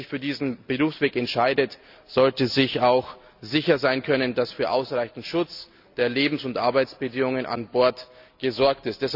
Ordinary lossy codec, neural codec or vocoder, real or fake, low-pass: none; none; real; 5.4 kHz